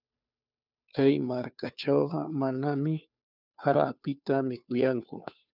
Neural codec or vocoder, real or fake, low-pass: codec, 16 kHz, 2 kbps, FunCodec, trained on Chinese and English, 25 frames a second; fake; 5.4 kHz